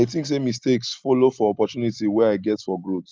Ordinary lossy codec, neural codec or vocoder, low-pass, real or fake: Opus, 32 kbps; none; 7.2 kHz; real